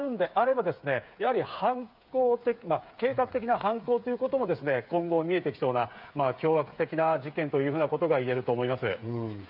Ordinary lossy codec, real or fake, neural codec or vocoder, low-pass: none; fake; codec, 16 kHz, 8 kbps, FreqCodec, smaller model; 5.4 kHz